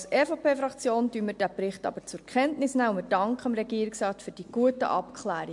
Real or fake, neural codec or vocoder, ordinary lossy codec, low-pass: real; none; MP3, 64 kbps; 10.8 kHz